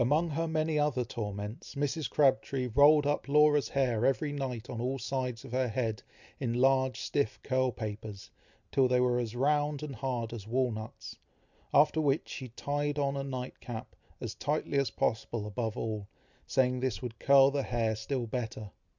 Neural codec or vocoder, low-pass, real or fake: none; 7.2 kHz; real